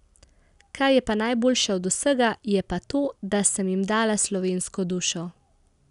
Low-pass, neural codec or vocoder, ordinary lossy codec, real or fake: 10.8 kHz; none; none; real